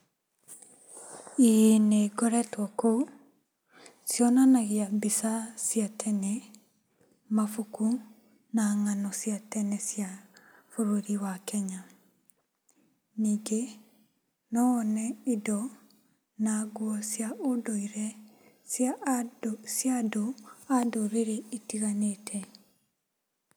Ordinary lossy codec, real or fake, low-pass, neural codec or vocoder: none; real; none; none